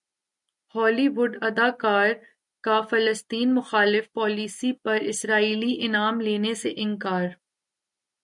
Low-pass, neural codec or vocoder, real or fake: 10.8 kHz; none; real